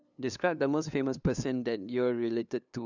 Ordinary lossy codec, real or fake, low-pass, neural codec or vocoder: none; fake; 7.2 kHz; codec, 16 kHz, 2 kbps, FunCodec, trained on LibriTTS, 25 frames a second